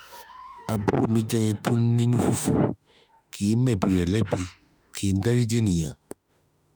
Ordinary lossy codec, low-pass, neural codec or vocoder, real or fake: none; none; autoencoder, 48 kHz, 32 numbers a frame, DAC-VAE, trained on Japanese speech; fake